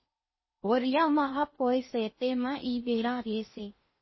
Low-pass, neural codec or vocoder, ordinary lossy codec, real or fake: 7.2 kHz; codec, 16 kHz in and 24 kHz out, 0.6 kbps, FocalCodec, streaming, 4096 codes; MP3, 24 kbps; fake